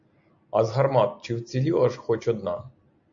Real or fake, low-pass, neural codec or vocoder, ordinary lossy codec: real; 7.2 kHz; none; AAC, 64 kbps